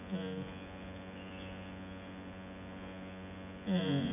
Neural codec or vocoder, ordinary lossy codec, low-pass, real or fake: vocoder, 24 kHz, 100 mel bands, Vocos; AAC, 32 kbps; 3.6 kHz; fake